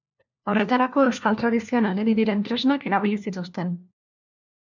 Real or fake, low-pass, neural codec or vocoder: fake; 7.2 kHz; codec, 16 kHz, 1 kbps, FunCodec, trained on LibriTTS, 50 frames a second